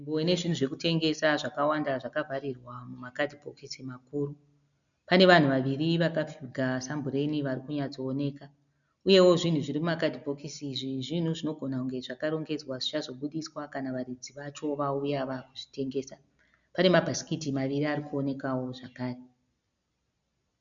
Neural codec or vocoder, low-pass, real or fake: none; 7.2 kHz; real